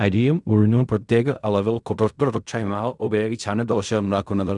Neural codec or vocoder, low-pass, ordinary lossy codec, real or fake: codec, 16 kHz in and 24 kHz out, 0.4 kbps, LongCat-Audio-Codec, fine tuned four codebook decoder; 10.8 kHz; none; fake